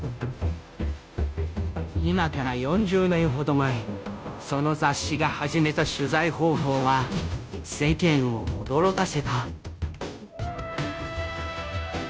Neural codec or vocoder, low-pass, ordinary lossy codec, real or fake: codec, 16 kHz, 0.5 kbps, FunCodec, trained on Chinese and English, 25 frames a second; none; none; fake